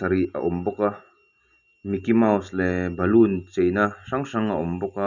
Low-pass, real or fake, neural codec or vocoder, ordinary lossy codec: 7.2 kHz; real; none; none